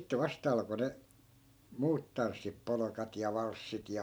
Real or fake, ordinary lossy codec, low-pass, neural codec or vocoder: real; none; none; none